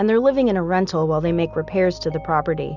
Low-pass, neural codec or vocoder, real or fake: 7.2 kHz; none; real